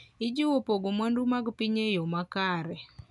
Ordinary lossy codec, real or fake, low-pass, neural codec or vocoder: none; real; 10.8 kHz; none